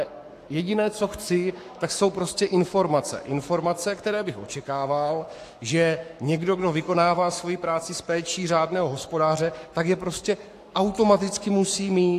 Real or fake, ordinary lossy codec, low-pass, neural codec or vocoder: fake; AAC, 64 kbps; 14.4 kHz; codec, 44.1 kHz, 7.8 kbps, Pupu-Codec